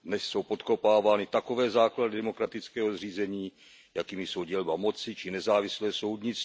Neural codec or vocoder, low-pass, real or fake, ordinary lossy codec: none; none; real; none